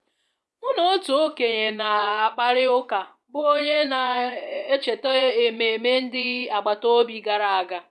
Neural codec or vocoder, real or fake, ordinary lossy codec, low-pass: vocoder, 24 kHz, 100 mel bands, Vocos; fake; none; none